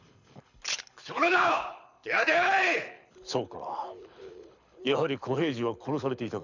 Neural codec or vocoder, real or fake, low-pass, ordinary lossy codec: codec, 24 kHz, 6 kbps, HILCodec; fake; 7.2 kHz; none